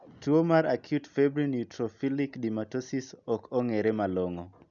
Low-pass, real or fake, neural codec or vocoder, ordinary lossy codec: 7.2 kHz; real; none; none